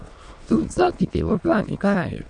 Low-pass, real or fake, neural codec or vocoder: 9.9 kHz; fake; autoencoder, 22.05 kHz, a latent of 192 numbers a frame, VITS, trained on many speakers